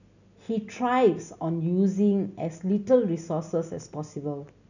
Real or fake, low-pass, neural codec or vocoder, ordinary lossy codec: real; 7.2 kHz; none; none